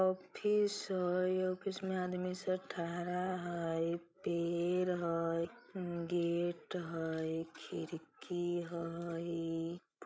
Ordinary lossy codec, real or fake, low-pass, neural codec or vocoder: none; fake; none; codec, 16 kHz, 16 kbps, FreqCodec, larger model